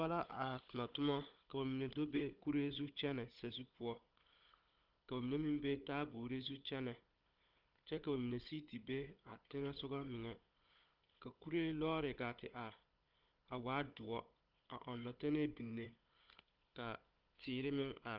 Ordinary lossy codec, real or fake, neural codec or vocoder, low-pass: Opus, 24 kbps; fake; codec, 16 kHz, 16 kbps, FunCodec, trained on Chinese and English, 50 frames a second; 5.4 kHz